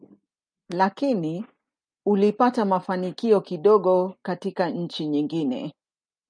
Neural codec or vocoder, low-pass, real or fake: none; 9.9 kHz; real